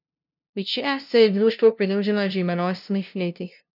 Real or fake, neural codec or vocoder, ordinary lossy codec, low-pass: fake; codec, 16 kHz, 0.5 kbps, FunCodec, trained on LibriTTS, 25 frames a second; none; 5.4 kHz